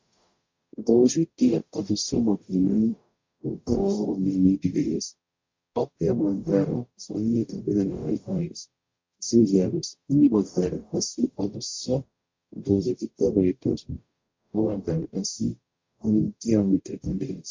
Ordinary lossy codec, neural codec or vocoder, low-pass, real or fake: MP3, 48 kbps; codec, 44.1 kHz, 0.9 kbps, DAC; 7.2 kHz; fake